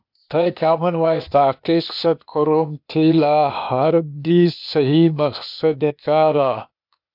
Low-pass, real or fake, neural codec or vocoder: 5.4 kHz; fake; codec, 16 kHz, 0.8 kbps, ZipCodec